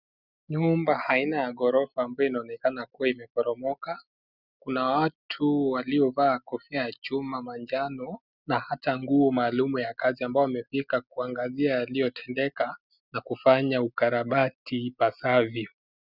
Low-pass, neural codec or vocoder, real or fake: 5.4 kHz; none; real